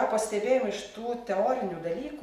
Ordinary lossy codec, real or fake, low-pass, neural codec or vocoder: Opus, 64 kbps; real; 14.4 kHz; none